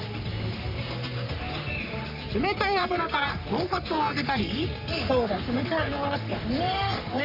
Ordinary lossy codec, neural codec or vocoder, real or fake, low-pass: none; codec, 44.1 kHz, 3.4 kbps, Pupu-Codec; fake; 5.4 kHz